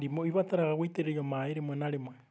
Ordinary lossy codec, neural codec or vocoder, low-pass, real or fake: none; none; none; real